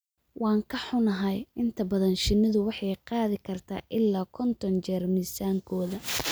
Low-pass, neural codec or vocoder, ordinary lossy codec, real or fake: none; none; none; real